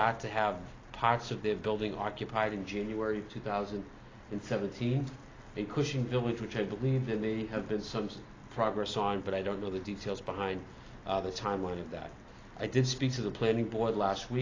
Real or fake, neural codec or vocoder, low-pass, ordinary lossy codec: real; none; 7.2 kHz; AAC, 32 kbps